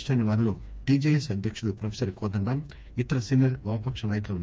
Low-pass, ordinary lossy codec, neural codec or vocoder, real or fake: none; none; codec, 16 kHz, 2 kbps, FreqCodec, smaller model; fake